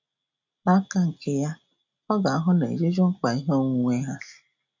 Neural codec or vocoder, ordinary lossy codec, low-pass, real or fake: none; none; 7.2 kHz; real